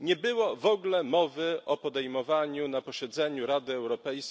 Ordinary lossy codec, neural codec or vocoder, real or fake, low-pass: none; none; real; none